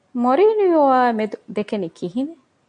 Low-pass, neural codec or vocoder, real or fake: 9.9 kHz; none; real